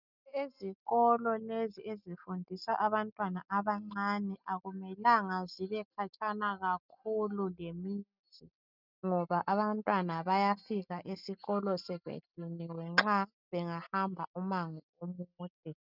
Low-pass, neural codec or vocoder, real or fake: 5.4 kHz; none; real